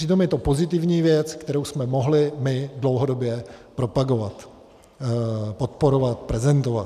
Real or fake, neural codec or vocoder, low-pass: real; none; 14.4 kHz